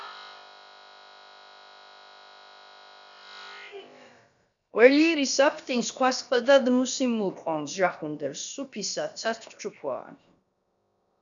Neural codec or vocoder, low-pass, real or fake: codec, 16 kHz, about 1 kbps, DyCAST, with the encoder's durations; 7.2 kHz; fake